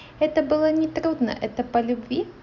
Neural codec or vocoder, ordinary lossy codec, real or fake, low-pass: none; none; real; 7.2 kHz